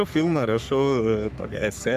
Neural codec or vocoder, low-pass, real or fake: codec, 44.1 kHz, 3.4 kbps, Pupu-Codec; 14.4 kHz; fake